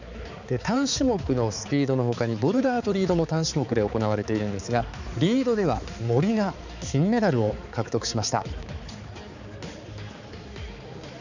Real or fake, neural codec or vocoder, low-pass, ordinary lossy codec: fake; codec, 16 kHz, 4 kbps, X-Codec, HuBERT features, trained on balanced general audio; 7.2 kHz; none